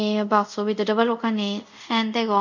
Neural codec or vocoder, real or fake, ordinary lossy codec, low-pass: codec, 24 kHz, 0.5 kbps, DualCodec; fake; none; 7.2 kHz